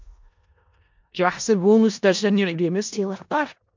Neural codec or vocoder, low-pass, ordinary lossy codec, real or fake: codec, 16 kHz in and 24 kHz out, 0.4 kbps, LongCat-Audio-Codec, four codebook decoder; 7.2 kHz; MP3, 64 kbps; fake